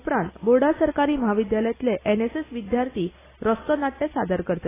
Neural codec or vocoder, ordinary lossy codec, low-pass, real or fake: none; AAC, 16 kbps; 3.6 kHz; real